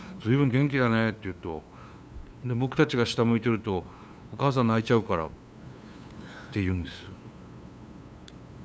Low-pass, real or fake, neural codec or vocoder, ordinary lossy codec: none; fake; codec, 16 kHz, 2 kbps, FunCodec, trained on LibriTTS, 25 frames a second; none